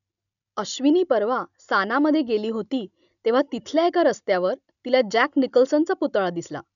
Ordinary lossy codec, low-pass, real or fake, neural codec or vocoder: none; 7.2 kHz; real; none